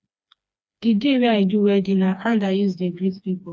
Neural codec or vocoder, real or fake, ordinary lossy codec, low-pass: codec, 16 kHz, 2 kbps, FreqCodec, smaller model; fake; none; none